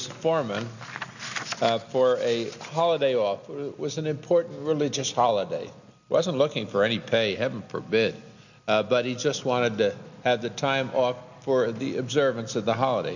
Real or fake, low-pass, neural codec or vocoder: real; 7.2 kHz; none